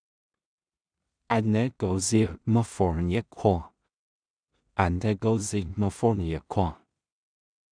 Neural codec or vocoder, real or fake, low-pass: codec, 16 kHz in and 24 kHz out, 0.4 kbps, LongCat-Audio-Codec, two codebook decoder; fake; 9.9 kHz